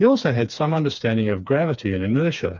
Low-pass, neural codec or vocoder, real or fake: 7.2 kHz; codec, 16 kHz, 4 kbps, FreqCodec, smaller model; fake